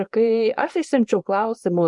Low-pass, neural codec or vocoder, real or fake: 10.8 kHz; codec, 24 kHz, 0.9 kbps, WavTokenizer, small release; fake